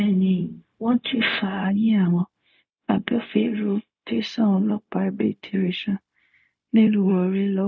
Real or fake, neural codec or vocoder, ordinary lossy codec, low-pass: fake; codec, 16 kHz, 0.4 kbps, LongCat-Audio-Codec; none; none